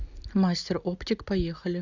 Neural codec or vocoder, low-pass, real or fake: none; 7.2 kHz; real